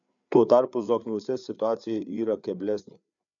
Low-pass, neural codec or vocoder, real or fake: 7.2 kHz; codec, 16 kHz, 4 kbps, FreqCodec, larger model; fake